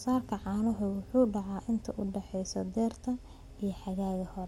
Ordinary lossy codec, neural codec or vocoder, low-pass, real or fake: MP3, 64 kbps; none; 19.8 kHz; real